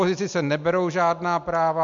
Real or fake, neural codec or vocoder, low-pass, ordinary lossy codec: real; none; 7.2 kHz; MP3, 64 kbps